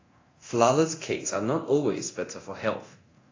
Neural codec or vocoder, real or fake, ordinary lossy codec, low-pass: codec, 24 kHz, 0.9 kbps, DualCodec; fake; AAC, 32 kbps; 7.2 kHz